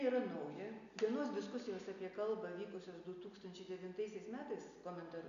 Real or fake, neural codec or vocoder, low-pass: real; none; 7.2 kHz